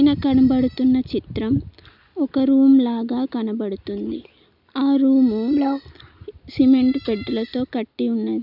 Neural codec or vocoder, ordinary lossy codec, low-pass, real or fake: none; none; 5.4 kHz; real